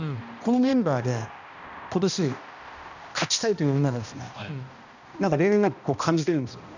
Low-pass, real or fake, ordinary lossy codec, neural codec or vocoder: 7.2 kHz; fake; none; codec, 16 kHz, 1 kbps, X-Codec, HuBERT features, trained on balanced general audio